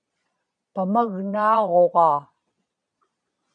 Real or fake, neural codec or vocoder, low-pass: fake; vocoder, 22.05 kHz, 80 mel bands, Vocos; 9.9 kHz